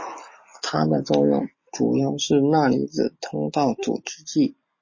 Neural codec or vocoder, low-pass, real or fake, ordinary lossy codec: none; 7.2 kHz; real; MP3, 32 kbps